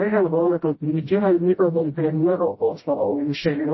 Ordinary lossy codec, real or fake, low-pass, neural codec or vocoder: MP3, 24 kbps; fake; 7.2 kHz; codec, 16 kHz, 0.5 kbps, FreqCodec, smaller model